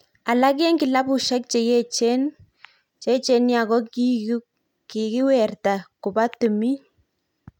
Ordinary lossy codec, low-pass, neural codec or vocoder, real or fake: none; 19.8 kHz; none; real